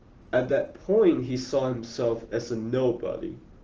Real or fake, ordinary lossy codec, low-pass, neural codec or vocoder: real; Opus, 16 kbps; 7.2 kHz; none